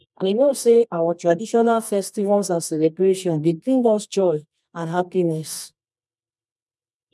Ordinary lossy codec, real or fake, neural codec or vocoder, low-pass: none; fake; codec, 24 kHz, 0.9 kbps, WavTokenizer, medium music audio release; none